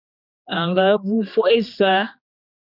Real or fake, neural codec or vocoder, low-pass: fake; codec, 16 kHz, 2 kbps, X-Codec, HuBERT features, trained on general audio; 5.4 kHz